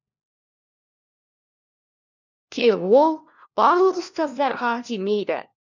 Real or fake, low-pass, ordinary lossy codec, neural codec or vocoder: fake; 7.2 kHz; none; codec, 16 kHz, 1 kbps, FunCodec, trained on LibriTTS, 50 frames a second